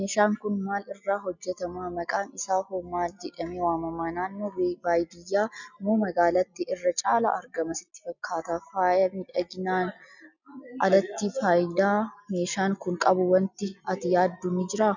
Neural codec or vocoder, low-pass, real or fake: none; 7.2 kHz; real